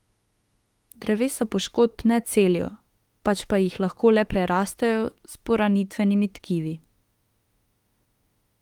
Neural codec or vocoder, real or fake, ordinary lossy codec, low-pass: autoencoder, 48 kHz, 32 numbers a frame, DAC-VAE, trained on Japanese speech; fake; Opus, 24 kbps; 19.8 kHz